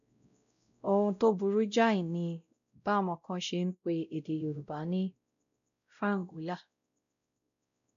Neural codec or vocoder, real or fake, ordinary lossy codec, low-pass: codec, 16 kHz, 0.5 kbps, X-Codec, WavLM features, trained on Multilingual LibriSpeech; fake; none; 7.2 kHz